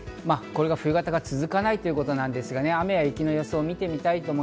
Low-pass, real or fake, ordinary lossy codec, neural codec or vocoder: none; real; none; none